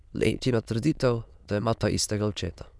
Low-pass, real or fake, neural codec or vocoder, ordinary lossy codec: none; fake; autoencoder, 22.05 kHz, a latent of 192 numbers a frame, VITS, trained on many speakers; none